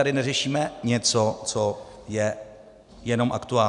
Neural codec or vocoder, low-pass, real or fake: none; 10.8 kHz; real